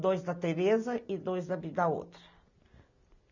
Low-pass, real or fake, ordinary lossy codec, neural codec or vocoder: 7.2 kHz; real; none; none